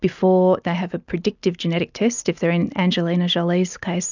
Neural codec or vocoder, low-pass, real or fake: none; 7.2 kHz; real